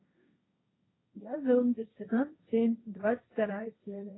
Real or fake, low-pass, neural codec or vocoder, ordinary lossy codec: fake; 7.2 kHz; codec, 16 kHz, 1.1 kbps, Voila-Tokenizer; AAC, 16 kbps